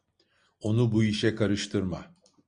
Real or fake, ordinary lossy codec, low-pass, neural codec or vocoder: real; Opus, 64 kbps; 9.9 kHz; none